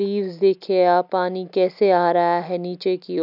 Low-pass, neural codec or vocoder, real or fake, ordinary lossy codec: 5.4 kHz; none; real; none